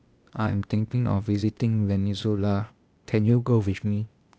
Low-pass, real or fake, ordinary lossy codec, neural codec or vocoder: none; fake; none; codec, 16 kHz, 0.8 kbps, ZipCodec